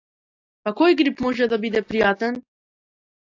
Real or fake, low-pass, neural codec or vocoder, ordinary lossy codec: real; 7.2 kHz; none; AAC, 48 kbps